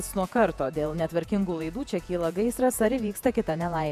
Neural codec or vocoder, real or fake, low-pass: vocoder, 48 kHz, 128 mel bands, Vocos; fake; 14.4 kHz